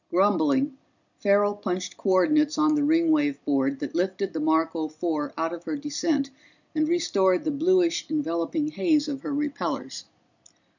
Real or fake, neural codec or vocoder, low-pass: real; none; 7.2 kHz